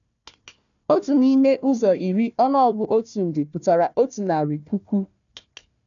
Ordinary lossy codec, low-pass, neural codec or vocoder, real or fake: none; 7.2 kHz; codec, 16 kHz, 1 kbps, FunCodec, trained on Chinese and English, 50 frames a second; fake